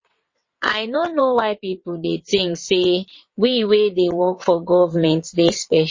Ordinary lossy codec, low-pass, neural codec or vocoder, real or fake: MP3, 32 kbps; 7.2 kHz; vocoder, 22.05 kHz, 80 mel bands, WaveNeXt; fake